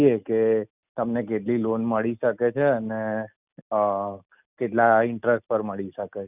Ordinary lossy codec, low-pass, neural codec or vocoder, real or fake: none; 3.6 kHz; none; real